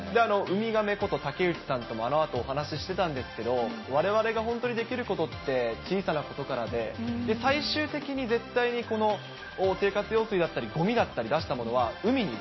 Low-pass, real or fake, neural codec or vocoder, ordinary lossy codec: 7.2 kHz; real; none; MP3, 24 kbps